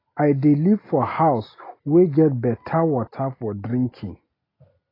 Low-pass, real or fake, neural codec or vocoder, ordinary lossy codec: 5.4 kHz; real; none; AAC, 24 kbps